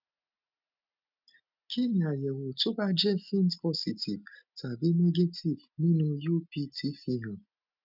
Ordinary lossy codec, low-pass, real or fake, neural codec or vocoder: none; 5.4 kHz; real; none